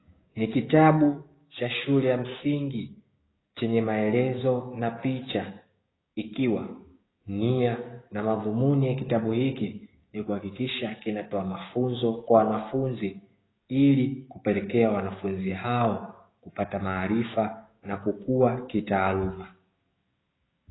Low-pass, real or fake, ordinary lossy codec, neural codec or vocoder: 7.2 kHz; fake; AAC, 16 kbps; codec, 16 kHz, 6 kbps, DAC